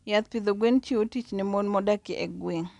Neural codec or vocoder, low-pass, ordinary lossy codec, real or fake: none; 10.8 kHz; none; real